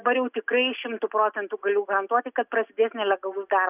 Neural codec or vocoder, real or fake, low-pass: none; real; 3.6 kHz